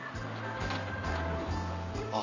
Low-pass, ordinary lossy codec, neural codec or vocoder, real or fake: 7.2 kHz; none; none; real